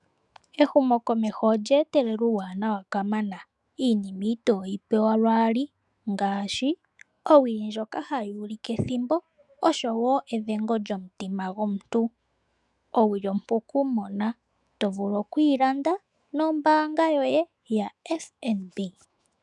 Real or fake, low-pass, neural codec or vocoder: fake; 10.8 kHz; autoencoder, 48 kHz, 128 numbers a frame, DAC-VAE, trained on Japanese speech